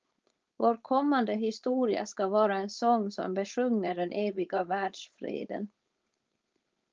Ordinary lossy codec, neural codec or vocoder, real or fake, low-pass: Opus, 24 kbps; codec, 16 kHz, 4.8 kbps, FACodec; fake; 7.2 kHz